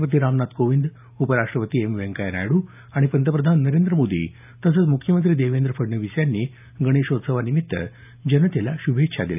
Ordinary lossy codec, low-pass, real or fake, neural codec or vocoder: none; 3.6 kHz; real; none